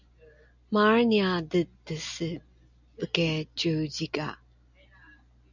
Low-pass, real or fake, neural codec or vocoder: 7.2 kHz; real; none